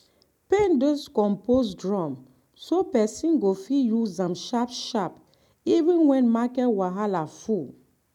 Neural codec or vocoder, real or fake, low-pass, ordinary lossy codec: none; real; 19.8 kHz; none